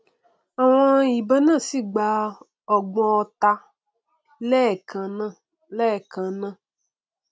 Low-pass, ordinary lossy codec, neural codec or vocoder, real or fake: none; none; none; real